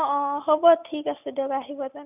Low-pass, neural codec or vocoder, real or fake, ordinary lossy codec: 3.6 kHz; none; real; none